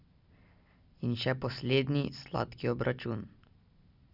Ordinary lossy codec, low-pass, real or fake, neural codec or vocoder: none; 5.4 kHz; real; none